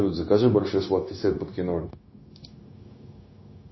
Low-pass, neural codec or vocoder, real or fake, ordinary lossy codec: 7.2 kHz; codec, 16 kHz, 0.9 kbps, LongCat-Audio-Codec; fake; MP3, 24 kbps